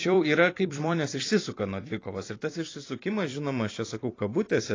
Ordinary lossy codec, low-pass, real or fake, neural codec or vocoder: AAC, 32 kbps; 7.2 kHz; fake; vocoder, 44.1 kHz, 128 mel bands every 256 samples, BigVGAN v2